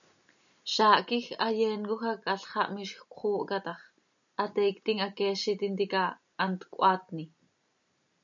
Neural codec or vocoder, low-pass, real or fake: none; 7.2 kHz; real